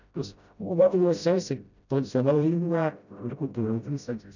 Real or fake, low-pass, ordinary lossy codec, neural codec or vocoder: fake; 7.2 kHz; none; codec, 16 kHz, 0.5 kbps, FreqCodec, smaller model